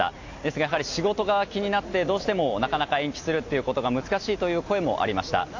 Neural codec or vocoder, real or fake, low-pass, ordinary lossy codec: none; real; 7.2 kHz; AAC, 48 kbps